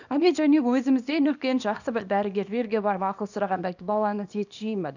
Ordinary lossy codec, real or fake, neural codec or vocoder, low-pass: none; fake; codec, 24 kHz, 0.9 kbps, WavTokenizer, small release; 7.2 kHz